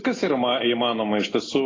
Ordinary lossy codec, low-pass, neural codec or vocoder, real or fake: AAC, 32 kbps; 7.2 kHz; none; real